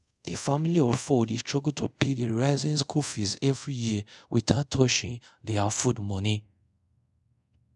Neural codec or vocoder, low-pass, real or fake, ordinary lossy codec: codec, 24 kHz, 0.5 kbps, DualCodec; 10.8 kHz; fake; none